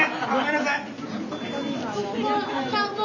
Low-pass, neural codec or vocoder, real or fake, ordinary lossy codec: 7.2 kHz; none; real; none